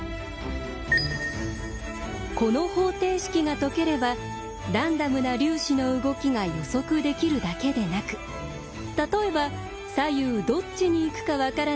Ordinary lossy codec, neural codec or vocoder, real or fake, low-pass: none; none; real; none